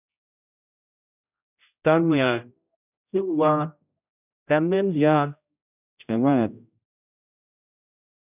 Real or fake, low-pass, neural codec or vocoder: fake; 3.6 kHz; codec, 16 kHz, 0.5 kbps, X-Codec, HuBERT features, trained on general audio